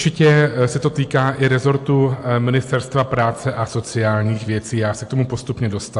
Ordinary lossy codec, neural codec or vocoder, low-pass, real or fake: AAC, 64 kbps; none; 10.8 kHz; real